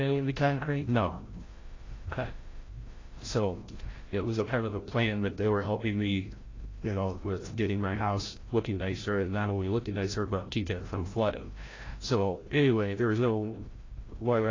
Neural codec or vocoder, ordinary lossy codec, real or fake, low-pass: codec, 16 kHz, 0.5 kbps, FreqCodec, larger model; AAC, 32 kbps; fake; 7.2 kHz